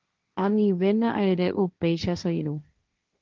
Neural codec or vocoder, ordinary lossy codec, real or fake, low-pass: codec, 16 kHz, 1.1 kbps, Voila-Tokenizer; Opus, 32 kbps; fake; 7.2 kHz